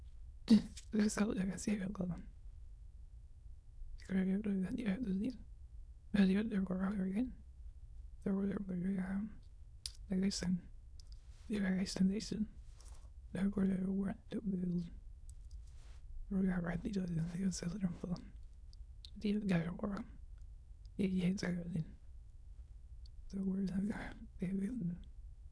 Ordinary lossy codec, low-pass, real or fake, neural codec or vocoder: none; none; fake; autoencoder, 22.05 kHz, a latent of 192 numbers a frame, VITS, trained on many speakers